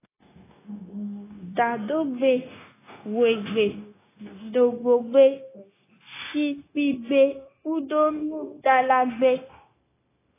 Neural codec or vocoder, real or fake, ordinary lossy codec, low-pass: codec, 16 kHz, 0.9 kbps, LongCat-Audio-Codec; fake; AAC, 16 kbps; 3.6 kHz